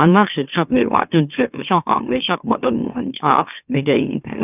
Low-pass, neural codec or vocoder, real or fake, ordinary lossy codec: 3.6 kHz; autoencoder, 44.1 kHz, a latent of 192 numbers a frame, MeloTTS; fake; none